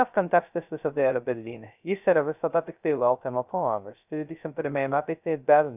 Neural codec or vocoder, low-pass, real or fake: codec, 16 kHz, 0.2 kbps, FocalCodec; 3.6 kHz; fake